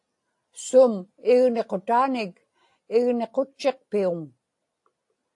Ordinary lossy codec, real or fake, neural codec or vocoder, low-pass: AAC, 48 kbps; real; none; 10.8 kHz